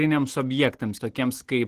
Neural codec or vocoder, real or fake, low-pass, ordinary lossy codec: none; real; 14.4 kHz; Opus, 16 kbps